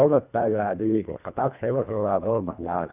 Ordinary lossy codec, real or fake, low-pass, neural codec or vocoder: none; fake; 3.6 kHz; codec, 24 kHz, 1.5 kbps, HILCodec